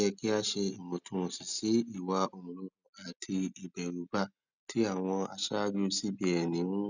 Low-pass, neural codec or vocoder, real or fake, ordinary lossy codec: 7.2 kHz; none; real; none